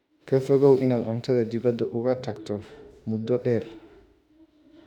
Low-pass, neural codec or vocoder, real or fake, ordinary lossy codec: 19.8 kHz; autoencoder, 48 kHz, 32 numbers a frame, DAC-VAE, trained on Japanese speech; fake; none